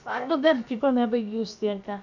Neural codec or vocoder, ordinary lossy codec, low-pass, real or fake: codec, 16 kHz, about 1 kbps, DyCAST, with the encoder's durations; none; 7.2 kHz; fake